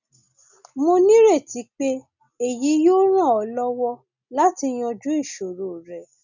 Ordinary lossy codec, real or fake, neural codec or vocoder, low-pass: none; real; none; 7.2 kHz